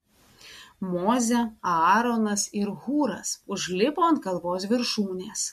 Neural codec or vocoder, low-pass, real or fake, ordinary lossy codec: none; 14.4 kHz; real; MP3, 64 kbps